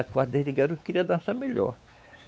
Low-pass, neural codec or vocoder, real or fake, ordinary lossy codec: none; codec, 16 kHz, 4 kbps, X-Codec, WavLM features, trained on Multilingual LibriSpeech; fake; none